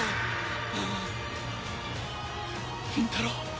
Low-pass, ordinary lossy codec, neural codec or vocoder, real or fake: none; none; none; real